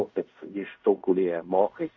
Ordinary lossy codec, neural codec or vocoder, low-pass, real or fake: MP3, 64 kbps; codec, 16 kHz in and 24 kHz out, 0.4 kbps, LongCat-Audio-Codec, fine tuned four codebook decoder; 7.2 kHz; fake